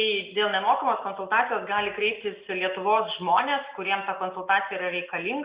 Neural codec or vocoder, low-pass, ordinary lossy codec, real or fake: none; 3.6 kHz; Opus, 64 kbps; real